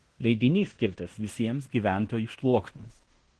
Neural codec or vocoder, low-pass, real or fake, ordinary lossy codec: codec, 16 kHz in and 24 kHz out, 0.9 kbps, LongCat-Audio-Codec, fine tuned four codebook decoder; 10.8 kHz; fake; Opus, 16 kbps